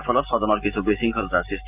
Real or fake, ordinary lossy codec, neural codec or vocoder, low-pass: real; Opus, 24 kbps; none; 3.6 kHz